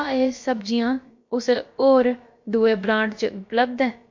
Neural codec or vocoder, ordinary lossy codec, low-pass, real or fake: codec, 16 kHz, about 1 kbps, DyCAST, with the encoder's durations; MP3, 48 kbps; 7.2 kHz; fake